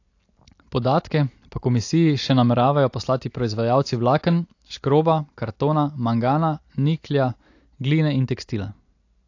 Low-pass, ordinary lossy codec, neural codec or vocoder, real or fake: 7.2 kHz; AAC, 48 kbps; none; real